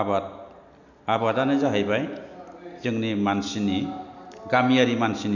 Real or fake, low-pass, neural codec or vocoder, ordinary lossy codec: real; 7.2 kHz; none; none